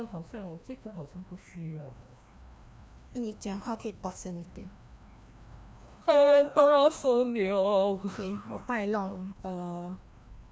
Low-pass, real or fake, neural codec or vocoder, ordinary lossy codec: none; fake; codec, 16 kHz, 1 kbps, FreqCodec, larger model; none